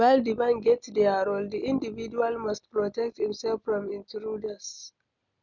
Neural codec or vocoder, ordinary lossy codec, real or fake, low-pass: none; none; real; 7.2 kHz